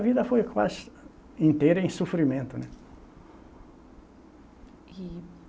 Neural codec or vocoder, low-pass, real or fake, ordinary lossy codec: none; none; real; none